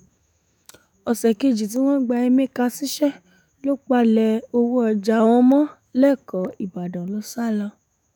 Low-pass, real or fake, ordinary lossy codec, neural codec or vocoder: none; fake; none; autoencoder, 48 kHz, 128 numbers a frame, DAC-VAE, trained on Japanese speech